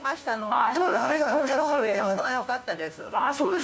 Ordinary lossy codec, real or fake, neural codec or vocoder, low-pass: none; fake; codec, 16 kHz, 1 kbps, FunCodec, trained on LibriTTS, 50 frames a second; none